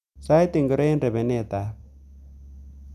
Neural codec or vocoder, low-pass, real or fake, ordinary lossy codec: none; 14.4 kHz; real; none